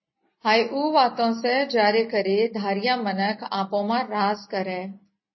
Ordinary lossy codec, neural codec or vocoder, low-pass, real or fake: MP3, 24 kbps; none; 7.2 kHz; real